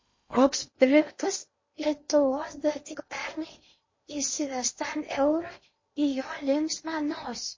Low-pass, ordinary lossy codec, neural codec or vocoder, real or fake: 7.2 kHz; MP3, 32 kbps; codec, 16 kHz in and 24 kHz out, 0.8 kbps, FocalCodec, streaming, 65536 codes; fake